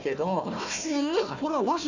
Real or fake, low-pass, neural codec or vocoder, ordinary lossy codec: fake; 7.2 kHz; codec, 16 kHz, 1 kbps, FunCodec, trained on Chinese and English, 50 frames a second; none